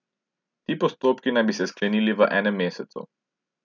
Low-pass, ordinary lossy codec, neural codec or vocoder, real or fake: 7.2 kHz; none; none; real